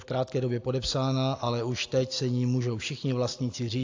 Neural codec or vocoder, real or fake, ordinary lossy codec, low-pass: none; real; AAC, 48 kbps; 7.2 kHz